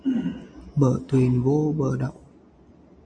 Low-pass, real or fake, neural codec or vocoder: 9.9 kHz; real; none